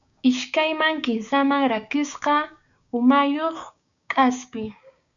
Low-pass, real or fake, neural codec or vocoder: 7.2 kHz; fake; codec, 16 kHz, 6 kbps, DAC